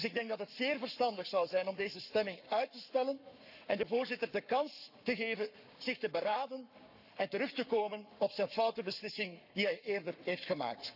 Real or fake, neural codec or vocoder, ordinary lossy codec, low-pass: fake; codec, 44.1 kHz, 7.8 kbps, Pupu-Codec; none; 5.4 kHz